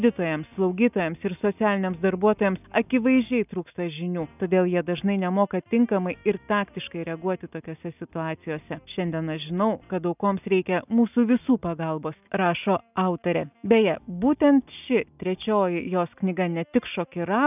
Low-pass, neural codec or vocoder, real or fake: 3.6 kHz; none; real